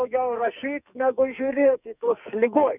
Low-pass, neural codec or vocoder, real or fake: 3.6 kHz; codec, 44.1 kHz, 2.6 kbps, SNAC; fake